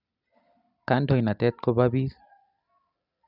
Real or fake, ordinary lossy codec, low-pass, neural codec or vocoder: real; none; 5.4 kHz; none